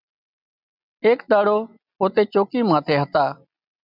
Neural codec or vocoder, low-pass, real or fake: none; 5.4 kHz; real